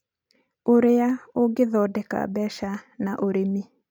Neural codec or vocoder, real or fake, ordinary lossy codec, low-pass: none; real; none; 19.8 kHz